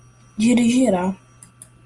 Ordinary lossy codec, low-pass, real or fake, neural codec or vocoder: Opus, 32 kbps; 10.8 kHz; real; none